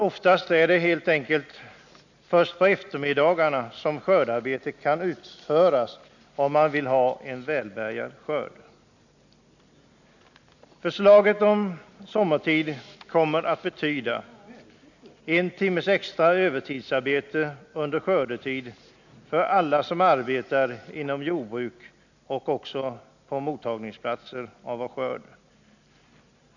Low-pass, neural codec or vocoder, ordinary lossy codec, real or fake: 7.2 kHz; none; none; real